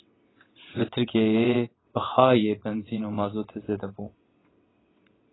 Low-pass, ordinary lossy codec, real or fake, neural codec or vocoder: 7.2 kHz; AAC, 16 kbps; fake; vocoder, 22.05 kHz, 80 mel bands, WaveNeXt